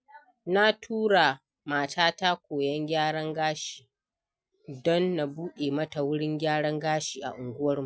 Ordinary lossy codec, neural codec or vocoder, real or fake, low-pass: none; none; real; none